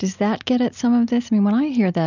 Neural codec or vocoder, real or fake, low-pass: none; real; 7.2 kHz